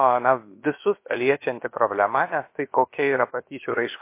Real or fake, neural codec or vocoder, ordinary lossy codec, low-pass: fake; codec, 16 kHz, about 1 kbps, DyCAST, with the encoder's durations; MP3, 24 kbps; 3.6 kHz